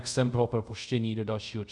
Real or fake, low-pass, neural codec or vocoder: fake; 10.8 kHz; codec, 24 kHz, 0.5 kbps, DualCodec